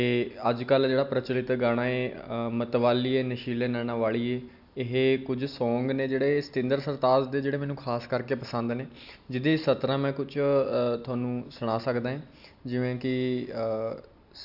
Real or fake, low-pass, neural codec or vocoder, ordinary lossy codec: real; 5.4 kHz; none; none